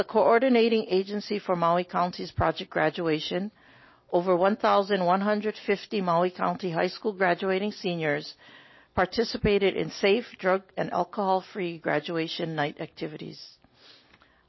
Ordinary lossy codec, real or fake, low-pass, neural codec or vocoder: MP3, 24 kbps; real; 7.2 kHz; none